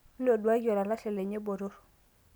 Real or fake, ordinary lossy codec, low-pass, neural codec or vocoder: real; none; none; none